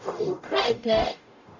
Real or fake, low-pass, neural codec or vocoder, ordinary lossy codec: fake; 7.2 kHz; codec, 44.1 kHz, 0.9 kbps, DAC; none